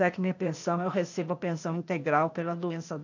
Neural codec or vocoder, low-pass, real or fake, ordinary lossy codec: codec, 16 kHz, 0.8 kbps, ZipCodec; 7.2 kHz; fake; none